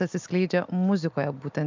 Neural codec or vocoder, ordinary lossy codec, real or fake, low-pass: none; MP3, 64 kbps; real; 7.2 kHz